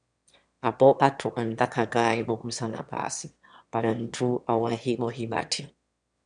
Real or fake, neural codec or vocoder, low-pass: fake; autoencoder, 22.05 kHz, a latent of 192 numbers a frame, VITS, trained on one speaker; 9.9 kHz